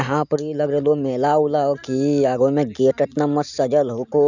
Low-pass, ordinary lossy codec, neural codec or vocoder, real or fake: 7.2 kHz; none; none; real